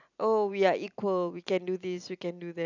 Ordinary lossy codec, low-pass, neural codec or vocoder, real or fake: none; 7.2 kHz; none; real